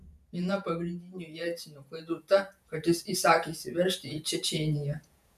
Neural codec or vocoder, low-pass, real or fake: vocoder, 48 kHz, 128 mel bands, Vocos; 14.4 kHz; fake